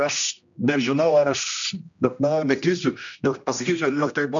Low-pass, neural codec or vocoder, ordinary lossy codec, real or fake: 7.2 kHz; codec, 16 kHz, 1 kbps, X-Codec, HuBERT features, trained on general audio; MP3, 64 kbps; fake